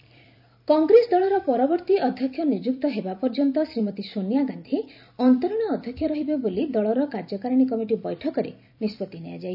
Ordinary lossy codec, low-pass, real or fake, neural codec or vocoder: none; 5.4 kHz; fake; vocoder, 44.1 kHz, 128 mel bands every 256 samples, BigVGAN v2